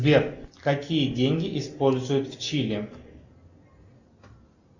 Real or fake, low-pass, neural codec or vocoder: real; 7.2 kHz; none